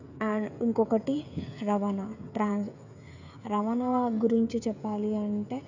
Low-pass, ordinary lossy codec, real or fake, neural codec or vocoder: 7.2 kHz; none; fake; codec, 16 kHz, 16 kbps, FreqCodec, smaller model